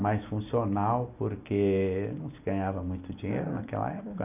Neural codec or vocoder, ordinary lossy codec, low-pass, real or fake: none; none; 3.6 kHz; real